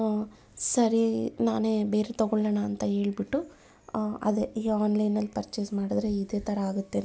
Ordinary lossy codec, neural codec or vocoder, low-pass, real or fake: none; none; none; real